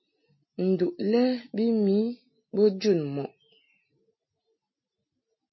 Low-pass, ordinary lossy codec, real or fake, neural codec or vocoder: 7.2 kHz; MP3, 24 kbps; real; none